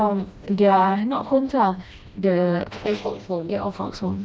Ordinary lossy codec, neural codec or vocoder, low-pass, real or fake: none; codec, 16 kHz, 1 kbps, FreqCodec, smaller model; none; fake